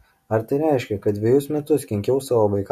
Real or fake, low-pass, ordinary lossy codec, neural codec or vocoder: real; 14.4 kHz; MP3, 64 kbps; none